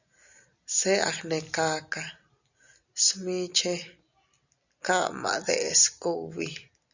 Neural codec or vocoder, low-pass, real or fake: none; 7.2 kHz; real